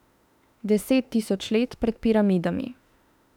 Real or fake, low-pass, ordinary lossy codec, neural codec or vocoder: fake; 19.8 kHz; none; autoencoder, 48 kHz, 32 numbers a frame, DAC-VAE, trained on Japanese speech